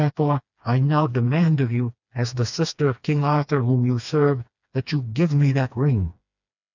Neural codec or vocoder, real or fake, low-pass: codec, 16 kHz, 2 kbps, FreqCodec, smaller model; fake; 7.2 kHz